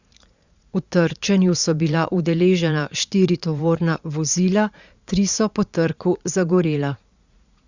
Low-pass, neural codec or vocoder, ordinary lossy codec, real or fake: 7.2 kHz; vocoder, 44.1 kHz, 80 mel bands, Vocos; Opus, 64 kbps; fake